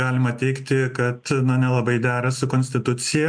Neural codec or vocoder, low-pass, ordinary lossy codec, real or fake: none; 9.9 kHz; MP3, 64 kbps; real